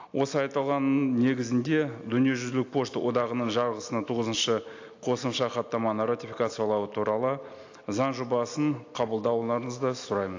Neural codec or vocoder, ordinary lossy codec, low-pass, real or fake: none; AAC, 48 kbps; 7.2 kHz; real